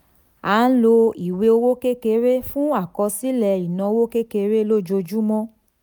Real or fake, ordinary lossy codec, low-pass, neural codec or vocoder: real; none; none; none